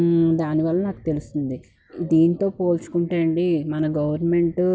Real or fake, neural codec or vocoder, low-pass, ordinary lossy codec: real; none; none; none